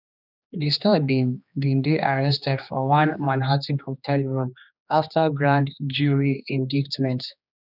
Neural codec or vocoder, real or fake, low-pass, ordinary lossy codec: codec, 16 kHz, 2 kbps, X-Codec, HuBERT features, trained on general audio; fake; 5.4 kHz; none